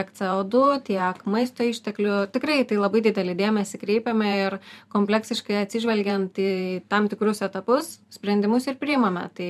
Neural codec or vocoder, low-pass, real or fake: vocoder, 44.1 kHz, 128 mel bands every 512 samples, BigVGAN v2; 14.4 kHz; fake